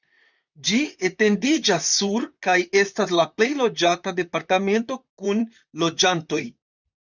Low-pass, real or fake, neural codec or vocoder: 7.2 kHz; fake; vocoder, 44.1 kHz, 128 mel bands, Pupu-Vocoder